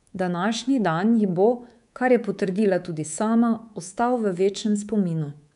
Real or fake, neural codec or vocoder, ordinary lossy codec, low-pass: fake; codec, 24 kHz, 3.1 kbps, DualCodec; none; 10.8 kHz